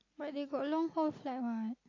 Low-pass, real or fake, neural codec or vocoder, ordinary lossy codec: 7.2 kHz; real; none; AAC, 32 kbps